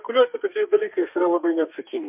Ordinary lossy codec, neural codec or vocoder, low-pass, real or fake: MP3, 32 kbps; codec, 32 kHz, 1.9 kbps, SNAC; 3.6 kHz; fake